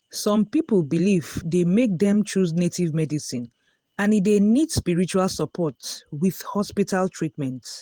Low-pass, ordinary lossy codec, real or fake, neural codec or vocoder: 19.8 kHz; Opus, 24 kbps; fake; vocoder, 48 kHz, 128 mel bands, Vocos